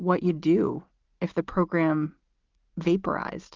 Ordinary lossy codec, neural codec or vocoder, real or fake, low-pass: Opus, 16 kbps; none; real; 7.2 kHz